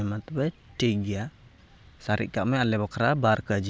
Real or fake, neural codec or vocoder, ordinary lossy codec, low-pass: real; none; none; none